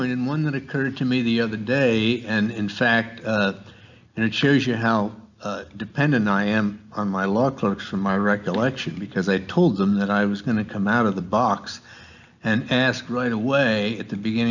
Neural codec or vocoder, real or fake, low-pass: none; real; 7.2 kHz